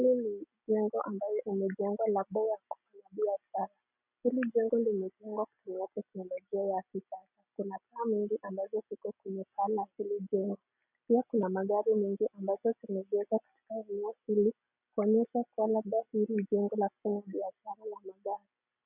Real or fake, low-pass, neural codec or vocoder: real; 3.6 kHz; none